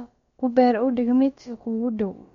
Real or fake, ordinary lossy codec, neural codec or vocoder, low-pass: fake; MP3, 48 kbps; codec, 16 kHz, about 1 kbps, DyCAST, with the encoder's durations; 7.2 kHz